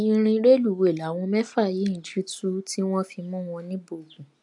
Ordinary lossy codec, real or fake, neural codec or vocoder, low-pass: AAC, 64 kbps; real; none; 10.8 kHz